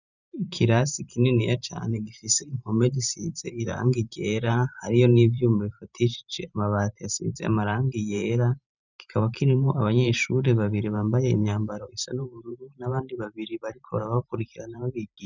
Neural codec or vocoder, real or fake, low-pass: vocoder, 44.1 kHz, 128 mel bands every 512 samples, BigVGAN v2; fake; 7.2 kHz